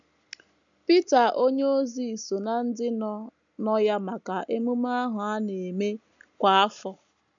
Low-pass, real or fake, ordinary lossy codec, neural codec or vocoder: 7.2 kHz; real; none; none